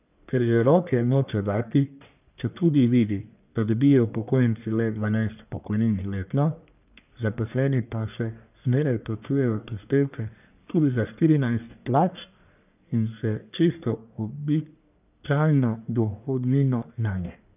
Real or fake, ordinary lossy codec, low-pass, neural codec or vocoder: fake; none; 3.6 kHz; codec, 44.1 kHz, 1.7 kbps, Pupu-Codec